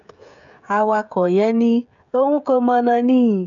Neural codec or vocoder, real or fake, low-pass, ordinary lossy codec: codec, 16 kHz, 16 kbps, FreqCodec, smaller model; fake; 7.2 kHz; none